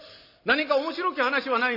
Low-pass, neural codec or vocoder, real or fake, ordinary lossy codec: 5.4 kHz; none; real; none